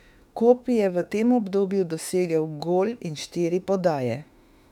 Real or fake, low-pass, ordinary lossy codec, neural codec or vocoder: fake; 19.8 kHz; none; autoencoder, 48 kHz, 32 numbers a frame, DAC-VAE, trained on Japanese speech